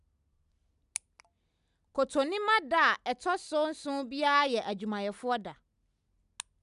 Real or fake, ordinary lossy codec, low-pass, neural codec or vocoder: real; none; 10.8 kHz; none